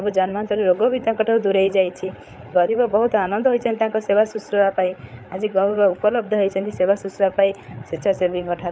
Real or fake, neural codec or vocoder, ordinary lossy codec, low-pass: fake; codec, 16 kHz, 8 kbps, FreqCodec, larger model; none; none